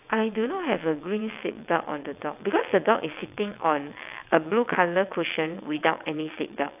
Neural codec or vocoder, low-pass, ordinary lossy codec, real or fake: vocoder, 22.05 kHz, 80 mel bands, WaveNeXt; 3.6 kHz; AAC, 32 kbps; fake